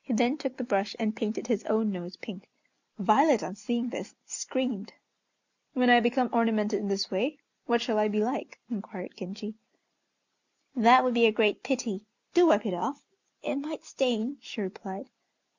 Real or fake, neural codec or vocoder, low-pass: real; none; 7.2 kHz